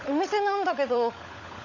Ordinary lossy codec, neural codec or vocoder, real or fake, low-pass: AAC, 48 kbps; codec, 16 kHz, 16 kbps, FunCodec, trained on LibriTTS, 50 frames a second; fake; 7.2 kHz